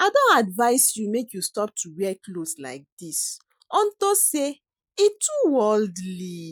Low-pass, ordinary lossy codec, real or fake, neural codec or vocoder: none; none; real; none